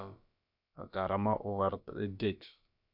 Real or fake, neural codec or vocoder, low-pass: fake; codec, 16 kHz, about 1 kbps, DyCAST, with the encoder's durations; 5.4 kHz